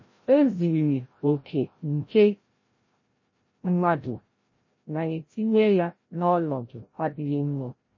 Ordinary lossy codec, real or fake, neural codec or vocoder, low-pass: MP3, 32 kbps; fake; codec, 16 kHz, 0.5 kbps, FreqCodec, larger model; 7.2 kHz